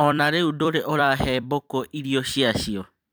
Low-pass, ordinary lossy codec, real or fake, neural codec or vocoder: none; none; fake; vocoder, 44.1 kHz, 128 mel bands every 256 samples, BigVGAN v2